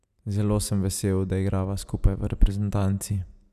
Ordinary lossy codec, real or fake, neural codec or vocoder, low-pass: none; real; none; 14.4 kHz